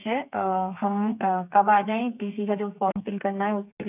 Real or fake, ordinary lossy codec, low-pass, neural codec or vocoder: fake; none; 3.6 kHz; codec, 32 kHz, 1.9 kbps, SNAC